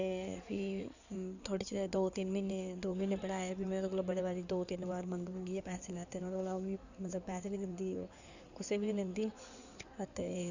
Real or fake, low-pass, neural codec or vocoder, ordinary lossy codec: fake; 7.2 kHz; codec, 16 kHz in and 24 kHz out, 2.2 kbps, FireRedTTS-2 codec; none